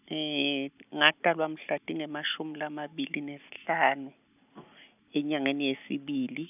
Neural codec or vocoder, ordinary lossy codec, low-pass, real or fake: none; none; 3.6 kHz; real